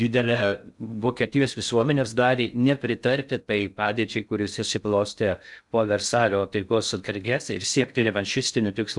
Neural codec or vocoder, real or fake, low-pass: codec, 16 kHz in and 24 kHz out, 0.6 kbps, FocalCodec, streaming, 2048 codes; fake; 10.8 kHz